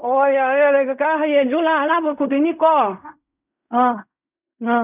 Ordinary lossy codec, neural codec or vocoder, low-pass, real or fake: none; codec, 16 kHz in and 24 kHz out, 0.4 kbps, LongCat-Audio-Codec, fine tuned four codebook decoder; 3.6 kHz; fake